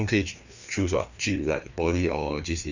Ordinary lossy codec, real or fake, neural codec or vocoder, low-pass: none; fake; codec, 16 kHz, 2 kbps, FreqCodec, larger model; 7.2 kHz